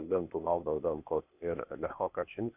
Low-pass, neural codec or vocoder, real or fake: 3.6 kHz; codec, 16 kHz, 0.8 kbps, ZipCodec; fake